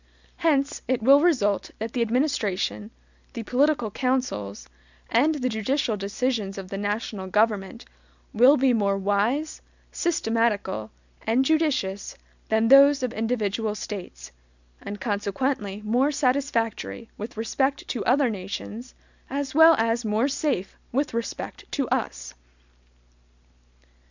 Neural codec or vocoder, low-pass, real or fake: none; 7.2 kHz; real